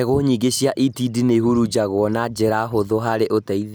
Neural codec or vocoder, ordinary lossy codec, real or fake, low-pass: none; none; real; none